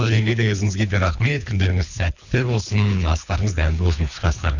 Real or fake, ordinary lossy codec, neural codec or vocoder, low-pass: fake; none; codec, 24 kHz, 3 kbps, HILCodec; 7.2 kHz